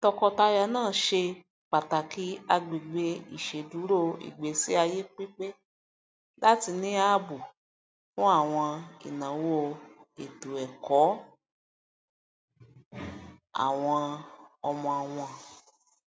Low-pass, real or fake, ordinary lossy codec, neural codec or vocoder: none; real; none; none